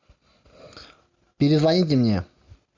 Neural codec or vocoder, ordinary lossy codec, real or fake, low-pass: none; MP3, 64 kbps; real; 7.2 kHz